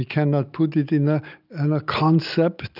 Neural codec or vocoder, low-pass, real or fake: none; 5.4 kHz; real